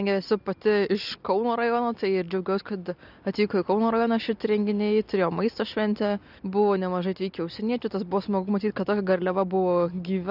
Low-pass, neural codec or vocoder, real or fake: 5.4 kHz; none; real